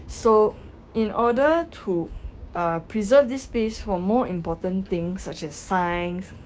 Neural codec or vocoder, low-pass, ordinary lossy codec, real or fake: codec, 16 kHz, 6 kbps, DAC; none; none; fake